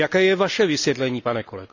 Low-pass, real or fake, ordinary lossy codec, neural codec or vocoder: 7.2 kHz; real; none; none